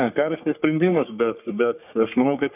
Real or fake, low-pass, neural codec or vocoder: fake; 3.6 kHz; codec, 44.1 kHz, 3.4 kbps, Pupu-Codec